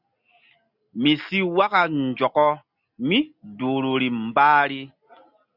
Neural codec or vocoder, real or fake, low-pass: none; real; 5.4 kHz